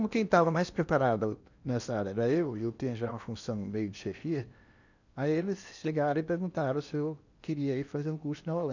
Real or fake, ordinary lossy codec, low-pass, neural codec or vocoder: fake; none; 7.2 kHz; codec, 16 kHz in and 24 kHz out, 0.8 kbps, FocalCodec, streaming, 65536 codes